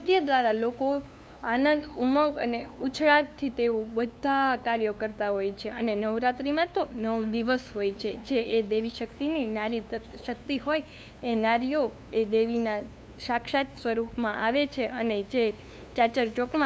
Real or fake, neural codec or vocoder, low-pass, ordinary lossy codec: fake; codec, 16 kHz, 2 kbps, FunCodec, trained on LibriTTS, 25 frames a second; none; none